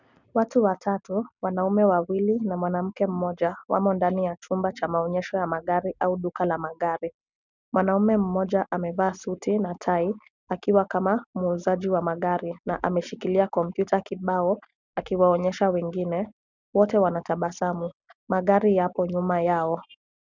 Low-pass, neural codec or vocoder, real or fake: 7.2 kHz; none; real